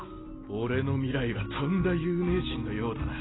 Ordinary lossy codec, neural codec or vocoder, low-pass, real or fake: AAC, 16 kbps; none; 7.2 kHz; real